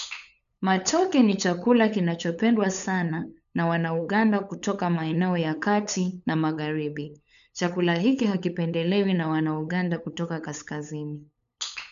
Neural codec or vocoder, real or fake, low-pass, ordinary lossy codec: codec, 16 kHz, 8 kbps, FunCodec, trained on LibriTTS, 25 frames a second; fake; 7.2 kHz; none